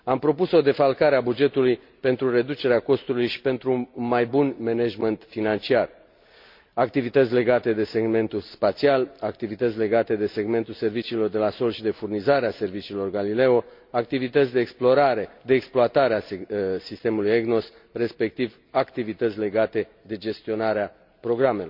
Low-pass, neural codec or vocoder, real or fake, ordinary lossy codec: 5.4 kHz; none; real; MP3, 48 kbps